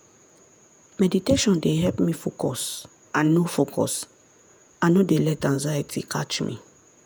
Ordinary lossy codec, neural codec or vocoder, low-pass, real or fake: none; vocoder, 48 kHz, 128 mel bands, Vocos; none; fake